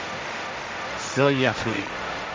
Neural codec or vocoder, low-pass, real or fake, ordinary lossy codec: codec, 16 kHz, 1.1 kbps, Voila-Tokenizer; none; fake; none